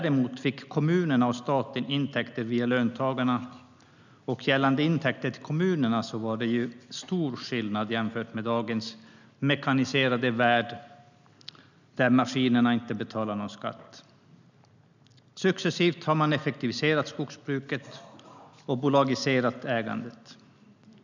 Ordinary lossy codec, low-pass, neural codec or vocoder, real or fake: none; 7.2 kHz; none; real